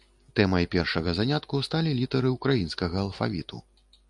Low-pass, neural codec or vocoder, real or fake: 10.8 kHz; none; real